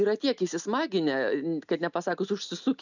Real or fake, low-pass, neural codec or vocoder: real; 7.2 kHz; none